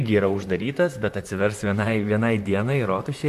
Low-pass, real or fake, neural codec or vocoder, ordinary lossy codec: 14.4 kHz; fake; vocoder, 44.1 kHz, 128 mel bands, Pupu-Vocoder; MP3, 96 kbps